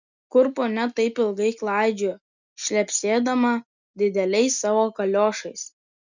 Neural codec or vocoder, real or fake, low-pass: none; real; 7.2 kHz